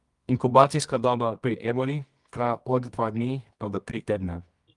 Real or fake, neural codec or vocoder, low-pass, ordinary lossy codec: fake; codec, 24 kHz, 0.9 kbps, WavTokenizer, medium music audio release; 10.8 kHz; Opus, 32 kbps